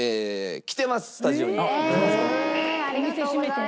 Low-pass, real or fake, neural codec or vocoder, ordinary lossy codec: none; real; none; none